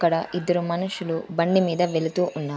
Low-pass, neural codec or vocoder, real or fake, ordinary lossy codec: none; none; real; none